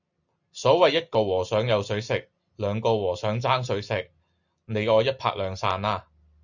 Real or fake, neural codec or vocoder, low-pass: real; none; 7.2 kHz